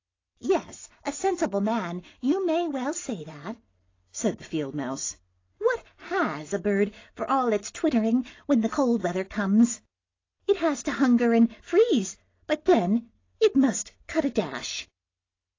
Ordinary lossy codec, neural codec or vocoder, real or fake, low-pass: AAC, 32 kbps; none; real; 7.2 kHz